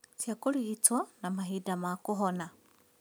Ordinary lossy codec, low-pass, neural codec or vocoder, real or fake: none; none; none; real